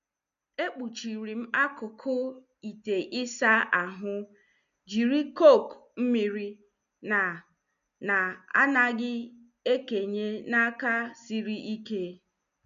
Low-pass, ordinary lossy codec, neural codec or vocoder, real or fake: 7.2 kHz; none; none; real